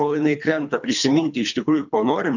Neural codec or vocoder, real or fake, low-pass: codec, 24 kHz, 3 kbps, HILCodec; fake; 7.2 kHz